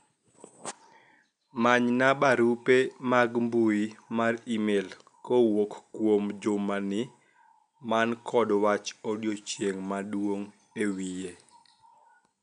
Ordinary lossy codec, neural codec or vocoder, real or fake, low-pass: none; none; real; 9.9 kHz